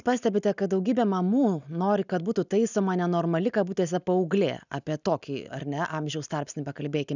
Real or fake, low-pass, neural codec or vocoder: real; 7.2 kHz; none